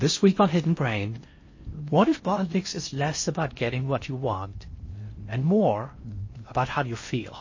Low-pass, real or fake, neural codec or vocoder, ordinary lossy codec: 7.2 kHz; fake; codec, 16 kHz in and 24 kHz out, 0.6 kbps, FocalCodec, streaming, 4096 codes; MP3, 32 kbps